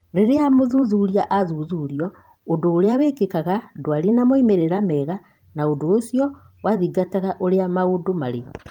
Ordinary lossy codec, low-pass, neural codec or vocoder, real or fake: Opus, 32 kbps; 19.8 kHz; vocoder, 44.1 kHz, 128 mel bands every 512 samples, BigVGAN v2; fake